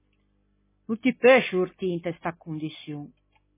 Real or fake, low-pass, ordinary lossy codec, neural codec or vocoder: real; 3.6 kHz; MP3, 16 kbps; none